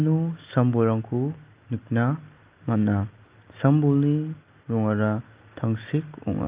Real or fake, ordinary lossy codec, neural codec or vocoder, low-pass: real; Opus, 32 kbps; none; 3.6 kHz